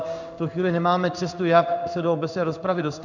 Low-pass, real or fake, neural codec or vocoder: 7.2 kHz; fake; codec, 16 kHz in and 24 kHz out, 1 kbps, XY-Tokenizer